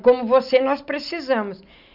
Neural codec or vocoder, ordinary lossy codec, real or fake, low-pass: none; none; real; 5.4 kHz